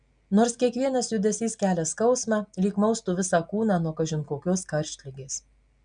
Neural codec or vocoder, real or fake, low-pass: none; real; 9.9 kHz